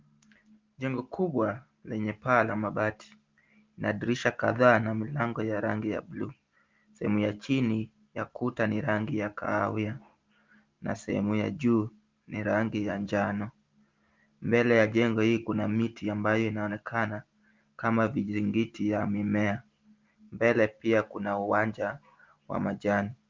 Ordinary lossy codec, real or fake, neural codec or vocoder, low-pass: Opus, 32 kbps; real; none; 7.2 kHz